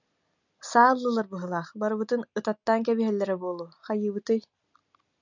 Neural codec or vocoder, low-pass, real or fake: none; 7.2 kHz; real